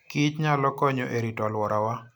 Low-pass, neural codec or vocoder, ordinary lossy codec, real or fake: none; none; none; real